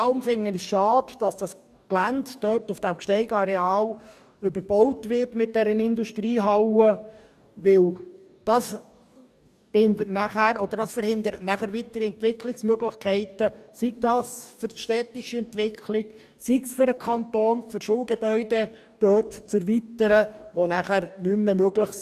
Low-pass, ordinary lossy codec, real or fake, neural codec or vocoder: 14.4 kHz; none; fake; codec, 44.1 kHz, 2.6 kbps, DAC